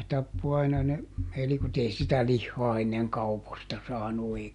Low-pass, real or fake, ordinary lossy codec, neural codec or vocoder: 10.8 kHz; real; none; none